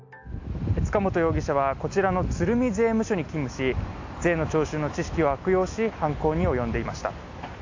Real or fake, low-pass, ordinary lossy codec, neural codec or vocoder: real; 7.2 kHz; AAC, 48 kbps; none